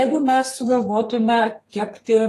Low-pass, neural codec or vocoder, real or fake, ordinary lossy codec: 14.4 kHz; codec, 32 kHz, 1.9 kbps, SNAC; fake; AAC, 48 kbps